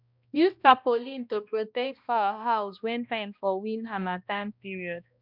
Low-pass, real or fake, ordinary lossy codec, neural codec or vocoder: 5.4 kHz; fake; none; codec, 16 kHz, 1 kbps, X-Codec, HuBERT features, trained on balanced general audio